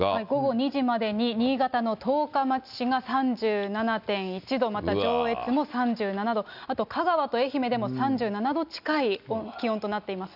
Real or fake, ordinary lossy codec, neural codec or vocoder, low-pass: real; none; none; 5.4 kHz